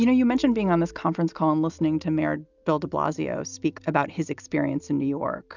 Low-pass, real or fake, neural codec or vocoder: 7.2 kHz; real; none